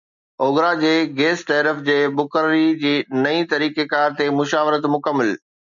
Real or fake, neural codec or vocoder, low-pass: real; none; 7.2 kHz